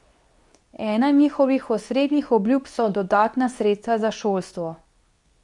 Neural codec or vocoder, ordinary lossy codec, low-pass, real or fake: codec, 24 kHz, 0.9 kbps, WavTokenizer, medium speech release version 2; none; 10.8 kHz; fake